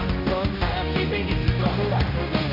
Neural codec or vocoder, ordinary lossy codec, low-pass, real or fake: codec, 16 kHz, 1 kbps, X-Codec, HuBERT features, trained on general audio; none; 5.4 kHz; fake